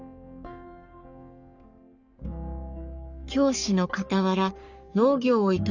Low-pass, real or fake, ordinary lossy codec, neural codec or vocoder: 7.2 kHz; fake; Opus, 64 kbps; codec, 44.1 kHz, 3.4 kbps, Pupu-Codec